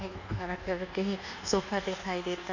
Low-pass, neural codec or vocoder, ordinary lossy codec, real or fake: 7.2 kHz; codec, 24 kHz, 1.2 kbps, DualCodec; none; fake